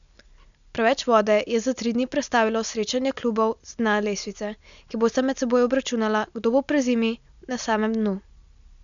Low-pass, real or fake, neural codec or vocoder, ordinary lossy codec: 7.2 kHz; real; none; none